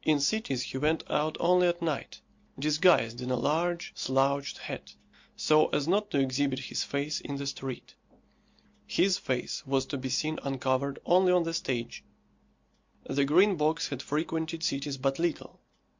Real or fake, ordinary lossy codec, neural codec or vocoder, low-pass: real; MP3, 48 kbps; none; 7.2 kHz